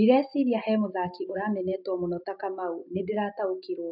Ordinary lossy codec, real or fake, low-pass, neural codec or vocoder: none; real; 5.4 kHz; none